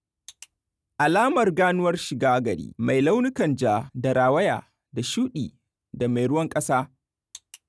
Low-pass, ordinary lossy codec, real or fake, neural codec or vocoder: none; none; real; none